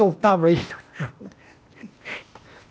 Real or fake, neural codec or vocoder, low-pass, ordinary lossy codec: fake; codec, 16 kHz, 0.8 kbps, ZipCodec; none; none